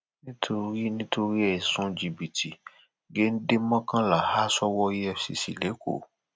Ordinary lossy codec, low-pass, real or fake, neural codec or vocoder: none; none; real; none